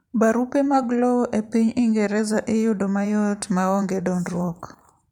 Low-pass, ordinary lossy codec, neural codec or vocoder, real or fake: 19.8 kHz; none; vocoder, 44.1 kHz, 128 mel bands every 512 samples, BigVGAN v2; fake